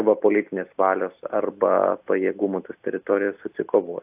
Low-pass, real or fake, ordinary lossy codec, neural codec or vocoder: 3.6 kHz; real; AAC, 32 kbps; none